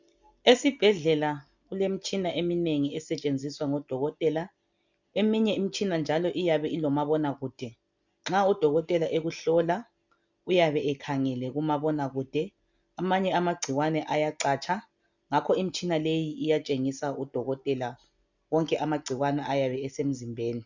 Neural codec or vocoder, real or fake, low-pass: none; real; 7.2 kHz